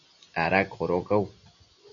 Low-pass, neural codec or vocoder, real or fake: 7.2 kHz; none; real